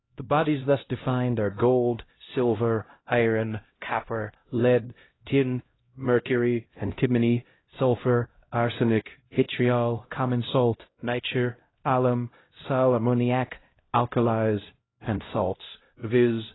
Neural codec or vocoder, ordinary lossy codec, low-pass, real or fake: codec, 16 kHz, 0.5 kbps, X-Codec, HuBERT features, trained on LibriSpeech; AAC, 16 kbps; 7.2 kHz; fake